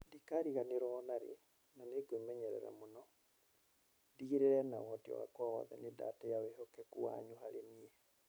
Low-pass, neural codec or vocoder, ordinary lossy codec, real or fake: none; vocoder, 44.1 kHz, 128 mel bands every 256 samples, BigVGAN v2; none; fake